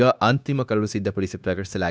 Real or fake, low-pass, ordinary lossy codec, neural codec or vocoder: fake; none; none; codec, 16 kHz, 0.9 kbps, LongCat-Audio-Codec